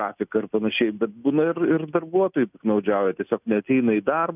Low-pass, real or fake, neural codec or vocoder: 3.6 kHz; real; none